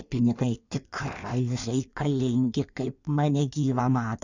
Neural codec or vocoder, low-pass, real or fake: codec, 16 kHz in and 24 kHz out, 1.1 kbps, FireRedTTS-2 codec; 7.2 kHz; fake